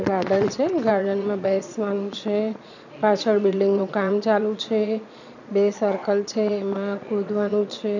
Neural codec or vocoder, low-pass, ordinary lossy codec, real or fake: vocoder, 22.05 kHz, 80 mel bands, Vocos; 7.2 kHz; none; fake